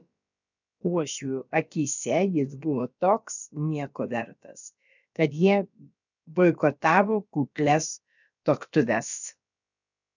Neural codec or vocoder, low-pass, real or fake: codec, 16 kHz, about 1 kbps, DyCAST, with the encoder's durations; 7.2 kHz; fake